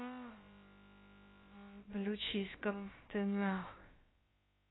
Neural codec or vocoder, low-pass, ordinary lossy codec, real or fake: codec, 16 kHz, about 1 kbps, DyCAST, with the encoder's durations; 7.2 kHz; AAC, 16 kbps; fake